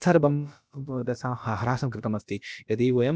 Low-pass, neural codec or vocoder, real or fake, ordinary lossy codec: none; codec, 16 kHz, about 1 kbps, DyCAST, with the encoder's durations; fake; none